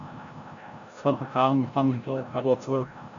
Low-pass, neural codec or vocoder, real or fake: 7.2 kHz; codec, 16 kHz, 0.5 kbps, FreqCodec, larger model; fake